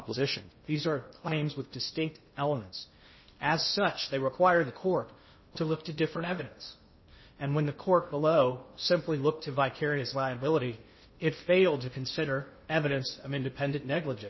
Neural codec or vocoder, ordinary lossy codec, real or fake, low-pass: codec, 16 kHz in and 24 kHz out, 0.6 kbps, FocalCodec, streaming, 2048 codes; MP3, 24 kbps; fake; 7.2 kHz